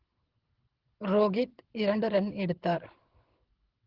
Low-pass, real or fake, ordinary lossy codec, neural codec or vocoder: 5.4 kHz; fake; Opus, 16 kbps; codec, 24 kHz, 6 kbps, HILCodec